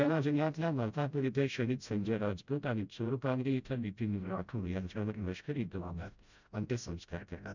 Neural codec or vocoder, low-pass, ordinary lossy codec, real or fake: codec, 16 kHz, 0.5 kbps, FreqCodec, smaller model; 7.2 kHz; none; fake